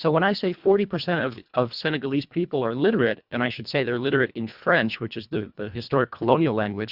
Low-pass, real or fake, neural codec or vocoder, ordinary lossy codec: 5.4 kHz; fake; codec, 24 kHz, 1.5 kbps, HILCodec; Opus, 64 kbps